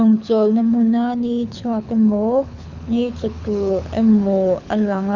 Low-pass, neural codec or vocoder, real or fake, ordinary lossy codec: 7.2 kHz; codec, 24 kHz, 6 kbps, HILCodec; fake; none